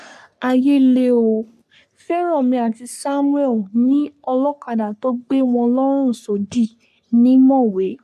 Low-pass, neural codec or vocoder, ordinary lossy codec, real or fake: 14.4 kHz; codec, 44.1 kHz, 3.4 kbps, Pupu-Codec; none; fake